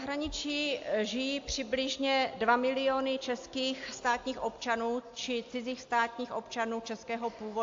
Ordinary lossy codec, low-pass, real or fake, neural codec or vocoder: AAC, 48 kbps; 7.2 kHz; real; none